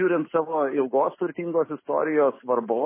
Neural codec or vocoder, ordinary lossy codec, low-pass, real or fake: none; MP3, 16 kbps; 3.6 kHz; real